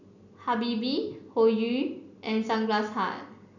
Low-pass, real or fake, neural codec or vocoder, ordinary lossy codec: 7.2 kHz; real; none; none